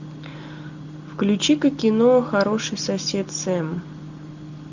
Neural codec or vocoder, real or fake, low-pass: none; real; 7.2 kHz